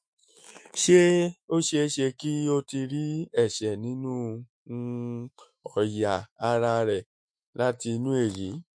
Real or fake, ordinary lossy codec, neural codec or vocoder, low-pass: fake; MP3, 48 kbps; autoencoder, 48 kHz, 128 numbers a frame, DAC-VAE, trained on Japanese speech; 9.9 kHz